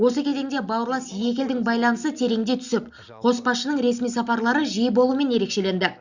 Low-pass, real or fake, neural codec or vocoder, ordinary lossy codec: 7.2 kHz; real; none; Opus, 64 kbps